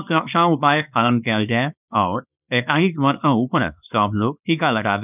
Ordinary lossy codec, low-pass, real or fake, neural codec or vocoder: none; 3.6 kHz; fake; codec, 24 kHz, 0.9 kbps, WavTokenizer, small release